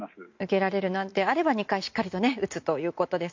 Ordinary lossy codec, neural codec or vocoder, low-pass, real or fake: MP3, 48 kbps; none; 7.2 kHz; real